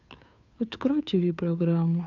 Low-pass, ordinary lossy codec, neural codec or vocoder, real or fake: 7.2 kHz; none; codec, 16 kHz, 2 kbps, FunCodec, trained on Chinese and English, 25 frames a second; fake